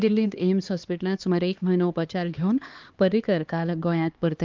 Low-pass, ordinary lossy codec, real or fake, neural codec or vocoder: 7.2 kHz; Opus, 32 kbps; fake; codec, 16 kHz, 2 kbps, X-Codec, HuBERT features, trained on LibriSpeech